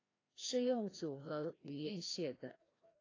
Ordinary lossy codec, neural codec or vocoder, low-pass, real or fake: AAC, 32 kbps; codec, 16 kHz, 1 kbps, FreqCodec, larger model; 7.2 kHz; fake